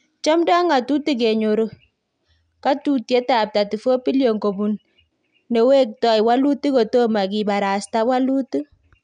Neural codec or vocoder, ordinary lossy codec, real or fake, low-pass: none; none; real; 9.9 kHz